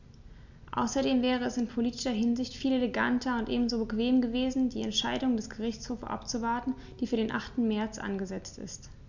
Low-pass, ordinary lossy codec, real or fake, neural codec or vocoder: 7.2 kHz; none; real; none